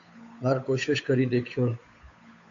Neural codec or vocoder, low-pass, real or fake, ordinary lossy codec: codec, 16 kHz, 8 kbps, FunCodec, trained on Chinese and English, 25 frames a second; 7.2 kHz; fake; AAC, 48 kbps